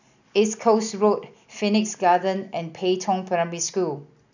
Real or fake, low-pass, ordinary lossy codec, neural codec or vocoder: real; 7.2 kHz; none; none